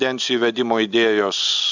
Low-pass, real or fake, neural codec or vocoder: 7.2 kHz; real; none